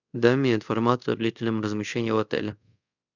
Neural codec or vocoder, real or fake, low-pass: codec, 24 kHz, 0.5 kbps, DualCodec; fake; 7.2 kHz